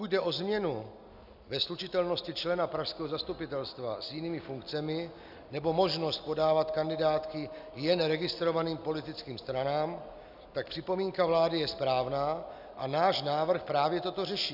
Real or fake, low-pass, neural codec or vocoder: real; 5.4 kHz; none